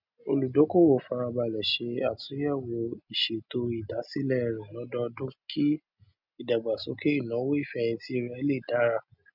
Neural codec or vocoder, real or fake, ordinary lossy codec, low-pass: none; real; none; 5.4 kHz